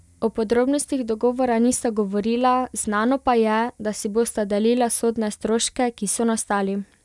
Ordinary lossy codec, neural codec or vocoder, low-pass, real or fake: none; none; none; real